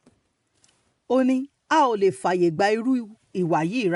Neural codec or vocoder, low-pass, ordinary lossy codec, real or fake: none; 10.8 kHz; none; real